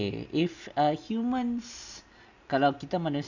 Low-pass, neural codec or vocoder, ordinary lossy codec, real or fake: 7.2 kHz; none; none; real